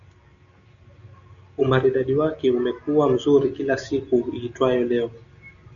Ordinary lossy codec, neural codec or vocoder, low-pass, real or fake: MP3, 96 kbps; none; 7.2 kHz; real